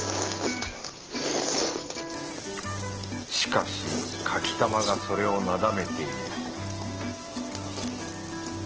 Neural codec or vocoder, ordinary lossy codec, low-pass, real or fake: none; Opus, 16 kbps; 7.2 kHz; real